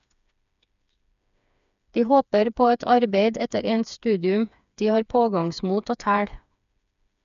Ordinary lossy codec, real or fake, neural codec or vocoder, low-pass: none; fake; codec, 16 kHz, 4 kbps, FreqCodec, smaller model; 7.2 kHz